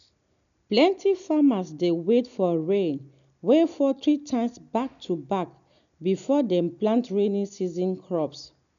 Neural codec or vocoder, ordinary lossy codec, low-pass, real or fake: none; none; 7.2 kHz; real